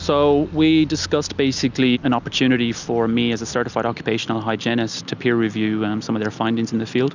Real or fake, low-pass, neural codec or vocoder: real; 7.2 kHz; none